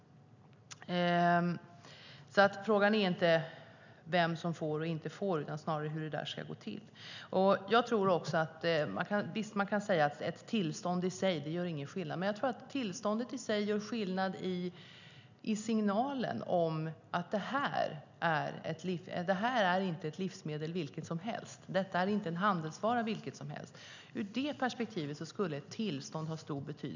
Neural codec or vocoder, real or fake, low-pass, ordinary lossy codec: none; real; 7.2 kHz; none